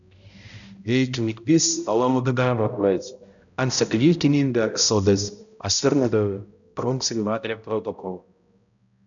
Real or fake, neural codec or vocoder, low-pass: fake; codec, 16 kHz, 0.5 kbps, X-Codec, HuBERT features, trained on balanced general audio; 7.2 kHz